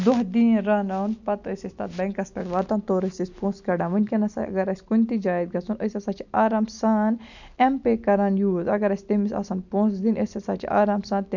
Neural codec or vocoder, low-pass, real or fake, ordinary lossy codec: none; 7.2 kHz; real; none